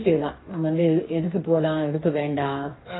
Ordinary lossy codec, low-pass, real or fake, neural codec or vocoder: AAC, 16 kbps; 7.2 kHz; fake; codec, 44.1 kHz, 2.6 kbps, DAC